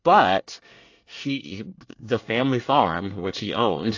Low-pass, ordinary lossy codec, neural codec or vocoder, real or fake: 7.2 kHz; AAC, 48 kbps; codec, 24 kHz, 1 kbps, SNAC; fake